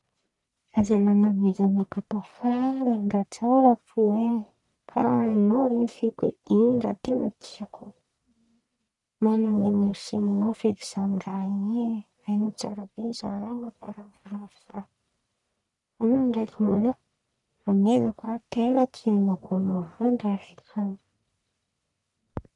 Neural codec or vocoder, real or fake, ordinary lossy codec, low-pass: codec, 44.1 kHz, 1.7 kbps, Pupu-Codec; fake; none; 10.8 kHz